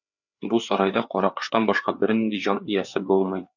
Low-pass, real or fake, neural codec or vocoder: 7.2 kHz; fake; codec, 16 kHz, 4 kbps, FreqCodec, larger model